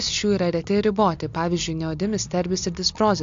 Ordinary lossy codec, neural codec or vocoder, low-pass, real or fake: AAC, 64 kbps; none; 7.2 kHz; real